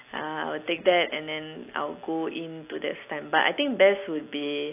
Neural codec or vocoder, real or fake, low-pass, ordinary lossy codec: none; real; 3.6 kHz; AAC, 24 kbps